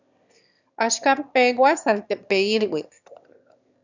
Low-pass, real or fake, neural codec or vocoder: 7.2 kHz; fake; autoencoder, 22.05 kHz, a latent of 192 numbers a frame, VITS, trained on one speaker